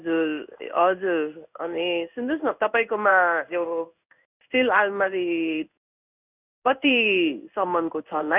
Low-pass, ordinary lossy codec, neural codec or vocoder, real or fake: 3.6 kHz; none; codec, 16 kHz in and 24 kHz out, 1 kbps, XY-Tokenizer; fake